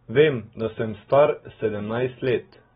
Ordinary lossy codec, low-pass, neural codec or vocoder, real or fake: AAC, 16 kbps; 19.8 kHz; none; real